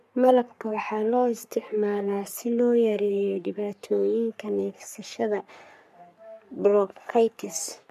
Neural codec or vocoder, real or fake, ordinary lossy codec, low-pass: codec, 44.1 kHz, 3.4 kbps, Pupu-Codec; fake; MP3, 96 kbps; 14.4 kHz